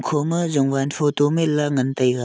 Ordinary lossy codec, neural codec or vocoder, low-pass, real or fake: none; none; none; real